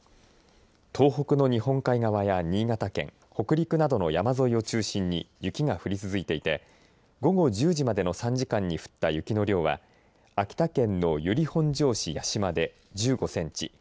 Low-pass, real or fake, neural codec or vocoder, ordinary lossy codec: none; real; none; none